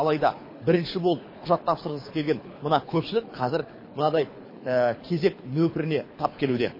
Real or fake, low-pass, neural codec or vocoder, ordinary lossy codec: fake; 5.4 kHz; codec, 24 kHz, 6 kbps, HILCodec; MP3, 24 kbps